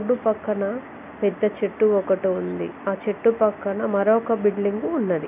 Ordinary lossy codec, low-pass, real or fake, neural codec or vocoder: none; 3.6 kHz; real; none